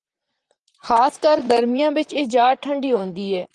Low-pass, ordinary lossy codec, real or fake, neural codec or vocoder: 10.8 kHz; Opus, 32 kbps; fake; vocoder, 44.1 kHz, 128 mel bands, Pupu-Vocoder